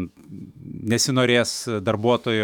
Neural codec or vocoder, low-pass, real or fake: autoencoder, 48 kHz, 128 numbers a frame, DAC-VAE, trained on Japanese speech; 19.8 kHz; fake